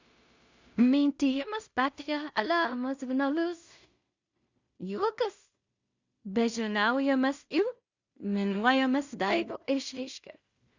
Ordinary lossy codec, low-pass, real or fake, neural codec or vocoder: Opus, 64 kbps; 7.2 kHz; fake; codec, 16 kHz in and 24 kHz out, 0.4 kbps, LongCat-Audio-Codec, two codebook decoder